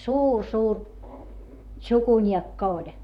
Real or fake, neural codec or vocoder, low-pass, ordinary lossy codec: fake; vocoder, 44.1 kHz, 128 mel bands, Pupu-Vocoder; 19.8 kHz; none